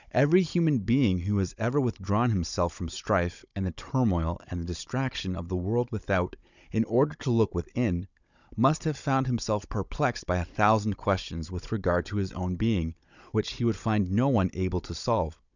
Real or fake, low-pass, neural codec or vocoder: fake; 7.2 kHz; codec, 16 kHz, 16 kbps, FunCodec, trained on Chinese and English, 50 frames a second